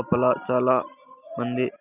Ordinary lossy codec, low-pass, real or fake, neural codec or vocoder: none; 3.6 kHz; real; none